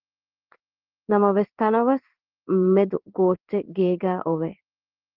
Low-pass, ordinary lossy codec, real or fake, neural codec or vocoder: 5.4 kHz; Opus, 16 kbps; fake; codec, 16 kHz in and 24 kHz out, 1 kbps, XY-Tokenizer